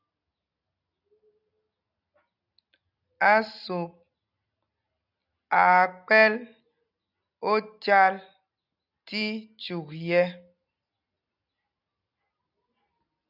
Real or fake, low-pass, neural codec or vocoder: real; 5.4 kHz; none